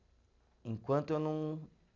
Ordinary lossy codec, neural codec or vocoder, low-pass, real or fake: none; none; 7.2 kHz; real